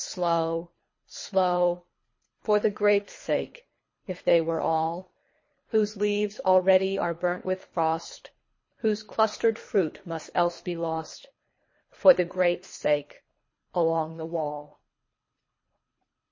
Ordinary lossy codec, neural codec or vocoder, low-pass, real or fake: MP3, 32 kbps; codec, 24 kHz, 3 kbps, HILCodec; 7.2 kHz; fake